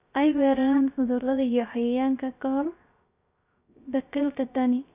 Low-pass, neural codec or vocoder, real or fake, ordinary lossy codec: 3.6 kHz; codec, 16 kHz, 0.3 kbps, FocalCodec; fake; Opus, 64 kbps